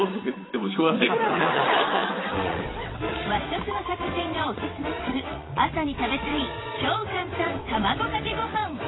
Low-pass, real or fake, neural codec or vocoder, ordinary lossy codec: 7.2 kHz; fake; vocoder, 22.05 kHz, 80 mel bands, WaveNeXt; AAC, 16 kbps